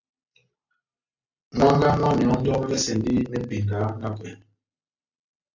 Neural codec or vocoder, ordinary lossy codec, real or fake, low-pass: none; AAC, 48 kbps; real; 7.2 kHz